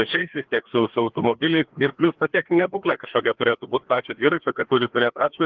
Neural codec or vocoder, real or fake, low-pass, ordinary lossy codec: codec, 16 kHz, 2 kbps, FreqCodec, larger model; fake; 7.2 kHz; Opus, 16 kbps